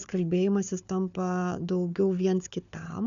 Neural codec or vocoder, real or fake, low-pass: codec, 16 kHz, 4 kbps, FunCodec, trained on Chinese and English, 50 frames a second; fake; 7.2 kHz